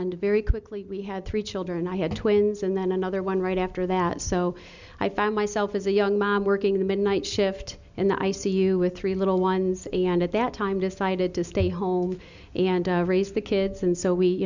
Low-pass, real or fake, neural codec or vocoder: 7.2 kHz; real; none